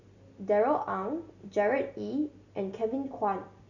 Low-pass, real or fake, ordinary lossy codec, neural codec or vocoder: 7.2 kHz; real; none; none